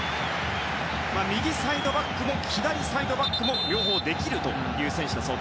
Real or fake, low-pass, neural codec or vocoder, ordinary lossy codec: real; none; none; none